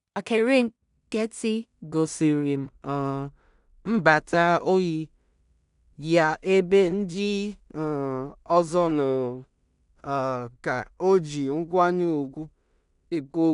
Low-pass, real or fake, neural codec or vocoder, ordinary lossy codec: 10.8 kHz; fake; codec, 16 kHz in and 24 kHz out, 0.4 kbps, LongCat-Audio-Codec, two codebook decoder; none